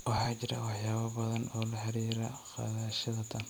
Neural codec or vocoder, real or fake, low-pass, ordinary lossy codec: vocoder, 44.1 kHz, 128 mel bands every 256 samples, BigVGAN v2; fake; none; none